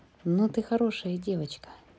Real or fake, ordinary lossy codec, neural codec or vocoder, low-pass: real; none; none; none